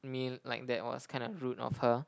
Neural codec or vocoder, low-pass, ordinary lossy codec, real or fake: none; none; none; real